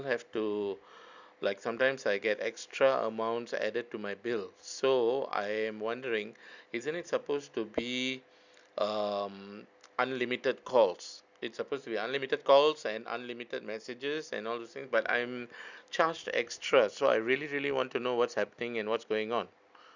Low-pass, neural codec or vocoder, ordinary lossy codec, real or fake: 7.2 kHz; none; none; real